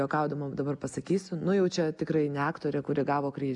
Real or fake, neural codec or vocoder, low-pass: real; none; 10.8 kHz